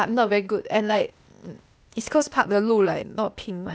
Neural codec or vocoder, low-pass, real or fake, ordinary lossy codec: codec, 16 kHz, 0.8 kbps, ZipCodec; none; fake; none